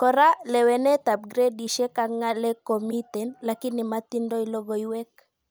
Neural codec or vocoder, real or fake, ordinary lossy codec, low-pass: none; real; none; none